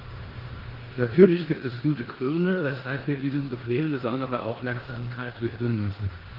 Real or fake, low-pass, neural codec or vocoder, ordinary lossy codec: fake; 5.4 kHz; codec, 16 kHz in and 24 kHz out, 0.9 kbps, LongCat-Audio-Codec, four codebook decoder; Opus, 16 kbps